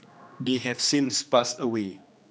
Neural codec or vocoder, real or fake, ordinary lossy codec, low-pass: codec, 16 kHz, 2 kbps, X-Codec, HuBERT features, trained on general audio; fake; none; none